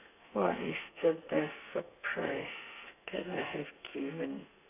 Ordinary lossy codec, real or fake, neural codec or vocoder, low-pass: none; fake; codec, 32 kHz, 1.9 kbps, SNAC; 3.6 kHz